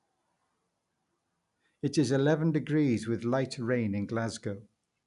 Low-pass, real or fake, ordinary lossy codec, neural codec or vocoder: 10.8 kHz; real; none; none